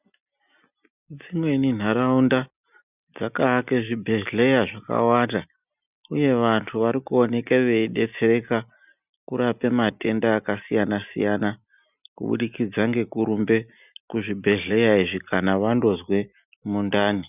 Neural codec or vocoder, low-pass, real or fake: none; 3.6 kHz; real